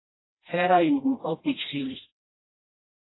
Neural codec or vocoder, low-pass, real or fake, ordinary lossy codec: codec, 16 kHz, 1 kbps, FreqCodec, smaller model; 7.2 kHz; fake; AAC, 16 kbps